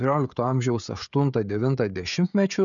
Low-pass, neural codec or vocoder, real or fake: 7.2 kHz; codec, 16 kHz, 16 kbps, FreqCodec, smaller model; fake